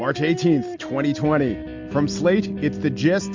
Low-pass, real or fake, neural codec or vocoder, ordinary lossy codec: 7.2 kHz; real; none; MP3, 64 kbps